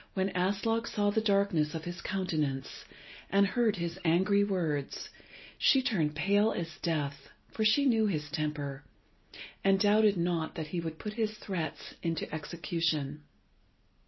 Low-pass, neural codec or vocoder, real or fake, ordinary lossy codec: 7.2 kHz; none; real; MP3, 24 kbps